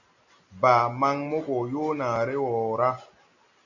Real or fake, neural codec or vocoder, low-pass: real; none; 7.2 kHz